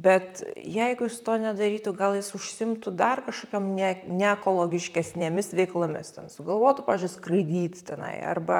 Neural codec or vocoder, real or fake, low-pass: vocoder, 44.1 kHz, 128 mel bands, Pupu-Vocoder; fake; 19.8 kHz